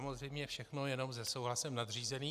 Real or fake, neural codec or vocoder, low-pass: real; none; 14.4 kHz